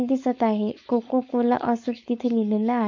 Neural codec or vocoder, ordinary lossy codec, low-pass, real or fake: codec, 16 kHz, 4.8 kbps, FACodec; MP3, 48 kbps; 7.2 kHz; fake